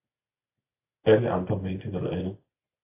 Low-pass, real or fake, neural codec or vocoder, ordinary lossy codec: 3.6 kHz; real; none; AAC, 32 kbps